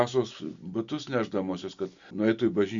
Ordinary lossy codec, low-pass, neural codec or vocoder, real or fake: Opus, 64 kbps; 7.2 kHz; none; real